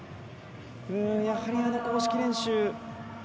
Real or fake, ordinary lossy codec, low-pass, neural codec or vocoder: real; none; none; none